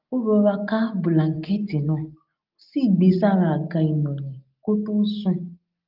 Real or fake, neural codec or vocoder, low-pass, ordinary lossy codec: real; none; 5.4 kHz; Opus, 24 kbps